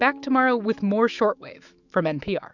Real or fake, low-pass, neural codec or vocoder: fake; 7.2 kHz; autoencoder, 48 kHz, 128 numbers a frame, DAC-VAE, trained on Japanese speech